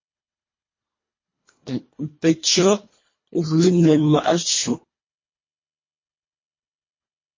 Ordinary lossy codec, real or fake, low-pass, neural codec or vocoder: MP3, 32 kbps; fake; 7.2 kHz; codec, 24 kHz, 1.5 kbps, HILCodec